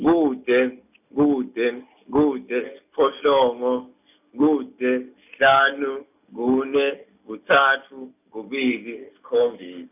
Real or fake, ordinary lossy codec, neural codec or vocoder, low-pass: real; none; none; 3.6 kHz